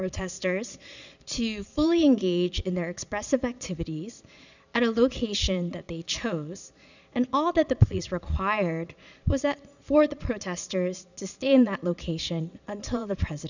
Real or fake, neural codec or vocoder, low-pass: fake; vocoder, 22.05 kHz, 80 mel bands, Vocos; 7.2 kHz